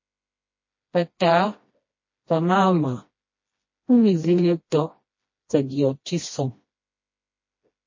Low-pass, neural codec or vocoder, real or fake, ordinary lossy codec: 7.2 kHz; codec, 16 kHz, 1 kbps, FreqCodec, smaller model; fake; MP3, 32 kbps